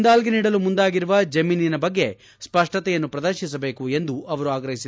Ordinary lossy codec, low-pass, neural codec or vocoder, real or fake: none; none; none; real